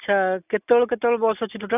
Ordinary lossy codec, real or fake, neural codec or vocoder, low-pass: none; real; none; 3.6 kHz